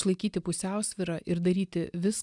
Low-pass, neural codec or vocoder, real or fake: 10.8 kHz; none; real